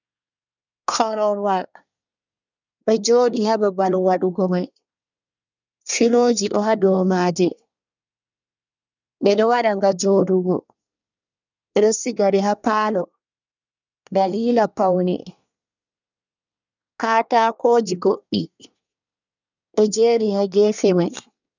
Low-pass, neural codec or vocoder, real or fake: 7.2 kHz; codec, 24 kHz, 1 kbps, SNAC; fake